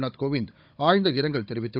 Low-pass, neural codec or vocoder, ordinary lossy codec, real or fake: 5.4 kHz; codec, 16 kHz, 16 kbps, FunCodec, trained on Chinese and English, 50 frames a second; none; fake